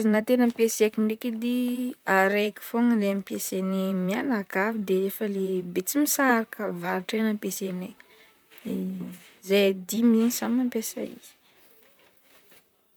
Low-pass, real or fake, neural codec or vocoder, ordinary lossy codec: none; fake; vocoder, 44.1 kHz, 128 mel bands, Pupu-Vocoder; none